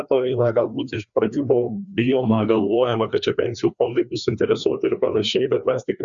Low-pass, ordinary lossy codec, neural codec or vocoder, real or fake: 7.2 kHz; Opus, 64 kbps; codec, 16 kHz, 2 kbps, FreqCodec, larger model; fake